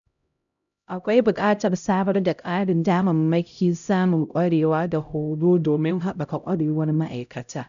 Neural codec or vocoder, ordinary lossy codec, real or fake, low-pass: codec, 16 kHz, 0.5 kbps, X-Codec, HuBERT features, trained on LibriSpeech; none; fake; 7.2 kHz